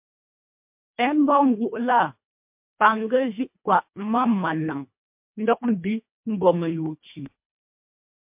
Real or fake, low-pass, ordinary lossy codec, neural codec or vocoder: fake; 3.6 kHz; MP3, 32 kbps; codec, 24 kHz, 1.5 kbps, HILCodec